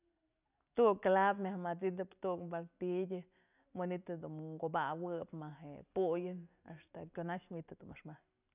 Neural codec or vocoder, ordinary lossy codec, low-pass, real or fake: none; none; 3.6 kHz; real